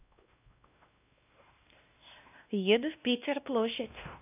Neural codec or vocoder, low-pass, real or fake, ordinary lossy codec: codec, 16 kHz, 1 kbps, X-Codec, WavLM features, trained on Multilingual LibriSpeech; 3.6 kHz; fake; none